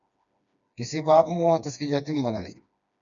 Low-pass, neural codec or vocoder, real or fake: 7.2 kHz; codec, 16 kHz, 2 kbps, FreqCodec, smaller model; fake